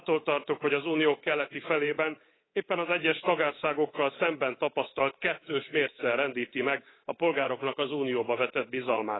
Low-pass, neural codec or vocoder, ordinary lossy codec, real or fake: 7.2 kHz; codec, 24 kHz, 3.1 kbps, DualCodec; AAC, 16 kbps; fake